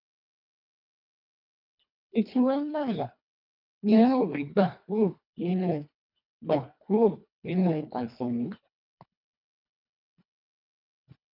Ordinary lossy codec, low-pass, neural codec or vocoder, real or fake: none; 5.4 kHz; codec, 24 kHz, 1.5 kbps, HILCodec; fake